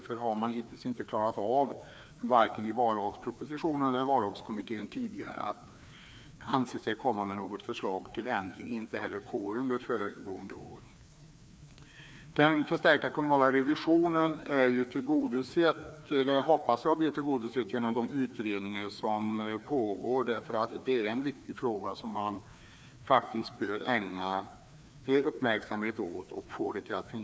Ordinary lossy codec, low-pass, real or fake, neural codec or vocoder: none; none; fake; codec, 16 kHz, 2 kbps, FreqCodec, larger model